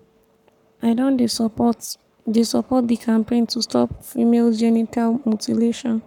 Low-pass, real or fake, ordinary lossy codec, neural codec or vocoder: 19.8 kHz; fake; none; codec, 44.1 kHz, 7.8 kbps, Pupu-Codec